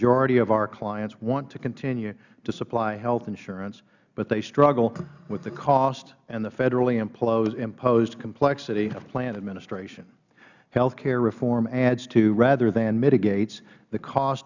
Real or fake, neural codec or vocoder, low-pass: real; none; 7.2 kHz